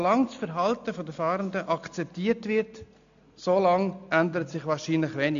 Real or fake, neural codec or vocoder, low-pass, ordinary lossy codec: real; none; 7.2 kHz; AAC, 96 kbps